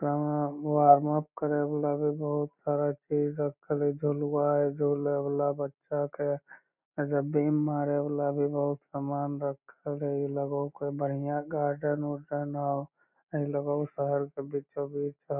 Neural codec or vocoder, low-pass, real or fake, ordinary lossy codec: none; 3.6 kHz; real; none